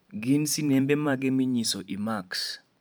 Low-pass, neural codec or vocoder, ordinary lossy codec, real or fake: none; vocoder, 44.1 kHz, 128 mel bands every 512 samples, BigVGAN v2; none; fake